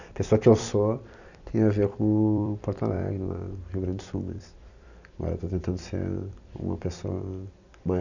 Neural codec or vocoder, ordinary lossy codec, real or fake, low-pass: vocoder, 44.1 kHz, 128 mel bands every 256 samples, BigVGAN v2; none; fake; 7.2 kHz